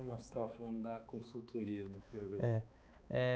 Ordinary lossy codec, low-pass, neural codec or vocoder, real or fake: none; none; codec, 16 kHz, 2 kbps, X-Codec, HuBERT features, trained on balanced general audio; fake